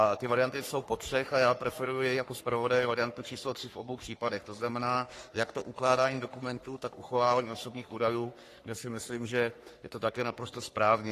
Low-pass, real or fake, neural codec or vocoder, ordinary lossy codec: 14.4 kHz; fake; codec, 44.1 kHz, 3.4 kbps, Pupu-Codec; AAC, 48 kbps